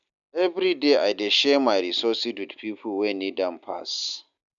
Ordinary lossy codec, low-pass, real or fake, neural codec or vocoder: none; 7.2 kHz; real; none